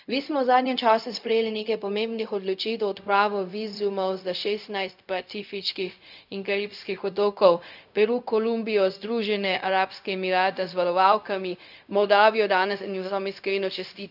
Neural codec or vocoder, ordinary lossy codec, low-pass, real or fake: codec, 16 kHz, 0.4 kbps, LongCat-Audio-Codec; none; 5.4 kHz; fake